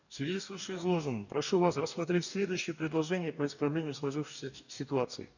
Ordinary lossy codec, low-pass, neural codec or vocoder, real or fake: none; 7.2 kHz; codec, 44.1 kHz, 2.6 kbps, DAC; fake